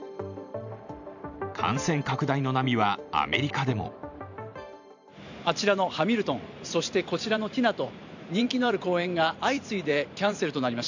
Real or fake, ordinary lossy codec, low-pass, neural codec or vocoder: fake; none; 7.2 kHz; vocoder, 44.1 kHz, 128 mel bands every 512 samples, BigVGAN v2